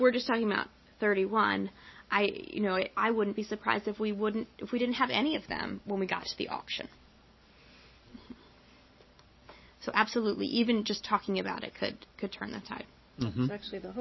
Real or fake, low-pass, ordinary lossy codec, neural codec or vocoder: real; 7.2 kHz; MP3, 24 kbps; none